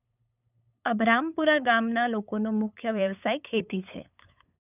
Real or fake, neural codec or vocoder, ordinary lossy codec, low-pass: fake; codec, 16 kHz, 8 kbps, FunCodec, trained on LibriTTS, 25 frames a second; none; 3.6 kHz